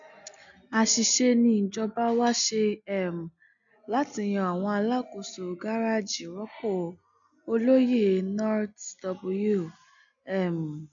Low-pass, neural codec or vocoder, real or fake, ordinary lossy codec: 7.2 kHz; none; real; none